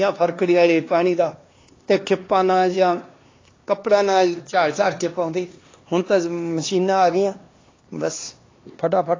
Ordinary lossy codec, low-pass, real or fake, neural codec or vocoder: AAC, 32 kbps; 7.2 kHz; fake; codec, 16 kHz, 2 kbps, X-Codec, HuBERT features, trained on LibriSpeech